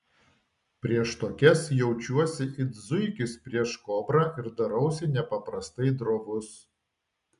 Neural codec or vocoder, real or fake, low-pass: none; real; 10.8 kHz